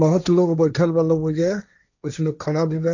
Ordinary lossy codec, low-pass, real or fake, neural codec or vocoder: none; 7.2 kHz; fake; codec, 16 kHz, 1.1 kbps, Voila-Tokenizer